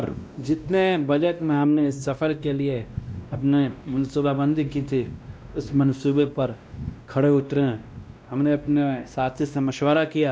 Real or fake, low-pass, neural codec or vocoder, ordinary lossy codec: fake; none; codec, 16 kHz, 1 kbps, X-Codec, WavLM features, trained on Multilingual LibriSpeech; none